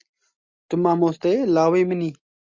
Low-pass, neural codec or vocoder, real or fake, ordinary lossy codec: 7.2 kHz; none; real; MP3, 64 kbps